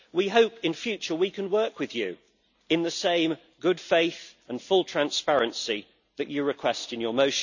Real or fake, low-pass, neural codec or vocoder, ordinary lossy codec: real; 7.2 kHz; none; none